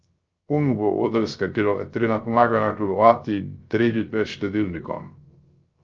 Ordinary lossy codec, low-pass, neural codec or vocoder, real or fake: Opus, 24 kbps; 7.2 kHz; codec, 16 kHz, 0.3 kbps, FocalCodec; fake